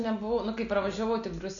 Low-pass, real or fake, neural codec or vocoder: 7.2 kHz; real; none